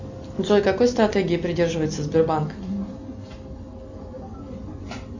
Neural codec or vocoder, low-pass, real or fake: none; 7.2 kHz; real